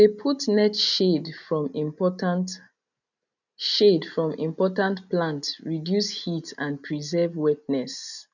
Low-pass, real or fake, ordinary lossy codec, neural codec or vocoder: 7.2 kHz; real; none; none